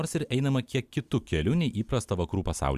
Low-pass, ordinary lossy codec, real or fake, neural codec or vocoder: 14.4 kHz; Opus, 64 kbps; fake; vocoder, 44.1 kHz, 128 mel bands every 256 samples, BigVGAN v2